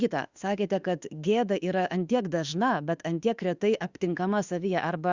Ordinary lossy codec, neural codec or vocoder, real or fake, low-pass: Opus, 64 kbps; autoencoder, 48 kHz, 32 numbers a frame, DAC-VAE, trained on Japanese speech; fake; 7.2 kHz